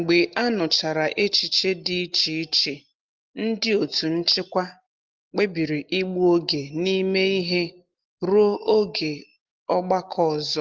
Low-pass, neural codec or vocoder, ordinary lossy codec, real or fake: 7.2 kHz; none; Opus, 32 kbps; real